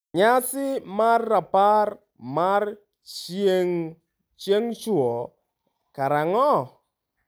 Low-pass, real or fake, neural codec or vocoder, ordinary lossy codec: none; real; none; none